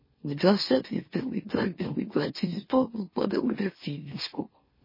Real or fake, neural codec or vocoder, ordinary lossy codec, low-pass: fake; autoencoder, 44.1 kHz, a latent of 192 numbers a frame, MeloTTS; MP3, 24 kbps; 5.4 kHz